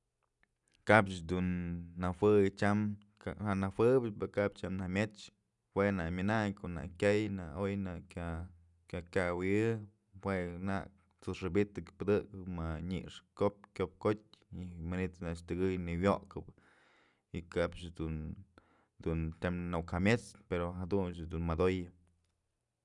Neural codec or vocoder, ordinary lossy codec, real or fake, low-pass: none; none; real; 10.8 kHz